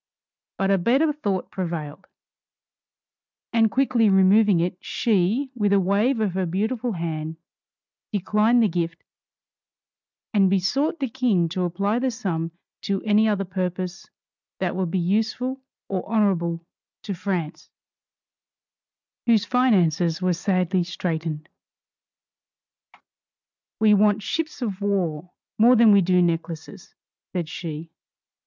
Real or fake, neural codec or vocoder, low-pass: real; none; 7.2 kHz